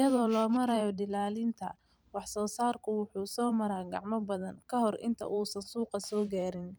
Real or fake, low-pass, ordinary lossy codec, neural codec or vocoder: fake; none; none; vocoder, 44.1 kHz, 128 mel bands every 512 samples, BigVGAN v2